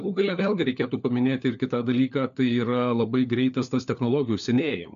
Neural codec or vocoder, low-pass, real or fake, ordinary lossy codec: codec, 16 kHz, 4 kbps, FunCodec, trained on LibriTTS, 50 frames a second; 7.2 kHz; fake; AAC, 96 kbps